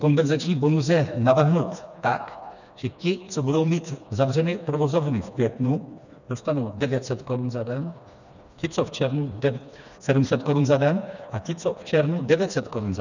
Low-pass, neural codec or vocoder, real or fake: 7.2 kHz; codec, 16 kHz, 2 kbps, FreqCodec, smaller model; fake